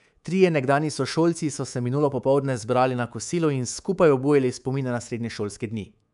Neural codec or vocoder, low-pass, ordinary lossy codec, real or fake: codec, 24 kHz, 3.1 kbps, DualCodec; 10.8 kHz; none; fake